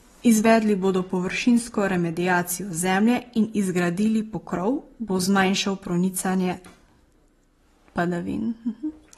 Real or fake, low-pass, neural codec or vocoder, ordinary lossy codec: real; 19.8 kHz; none; AAC, 32 kbps